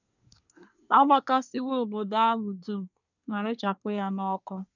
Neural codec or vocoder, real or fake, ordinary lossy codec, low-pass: codec, 24 kHz, 1 kbps, SNAC; fake; none; 7.2 kHz